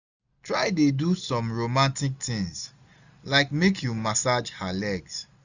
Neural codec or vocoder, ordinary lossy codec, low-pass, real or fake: none; none; 7.2 kHz; real